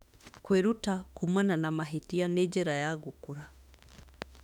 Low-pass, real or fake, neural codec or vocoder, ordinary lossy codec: 19.8 kHz; fake; autoencoder, 48 kHz, 32 numbers a frame, DAC-VAE, trained on Japanese speech; none